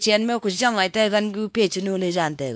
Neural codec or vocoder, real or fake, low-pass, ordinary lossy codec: codec, 16 kHz, 2 kbps, X-Codec, WavLM features, trained on Multilingual LibriSpeech; fake; none; none